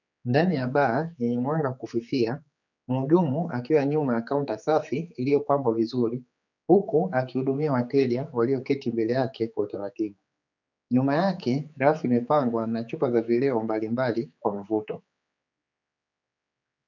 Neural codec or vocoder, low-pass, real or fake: codec, 16 kHz, 4 kbps, X-Codec, HuBERT features, trained on general audio; 7.2 kHz; fake